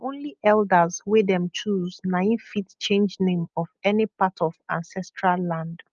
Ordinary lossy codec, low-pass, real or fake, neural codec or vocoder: Opus, 24 kbps; 7.2 kHz; real; none